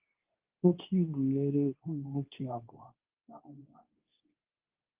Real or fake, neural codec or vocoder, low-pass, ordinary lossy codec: fake; codec, 24 kHz, 0.9 kbps, WavTokenizer, medium speech release version 2; 3.6 kHz; Opus, 32 kbps